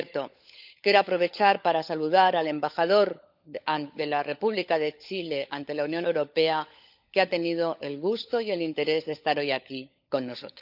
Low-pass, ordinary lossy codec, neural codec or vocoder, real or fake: 5.4 kHz; none; codec, 16 kHz, 16 kbps, FunCodec, trained on LibriTTS, 50 frames a second; fake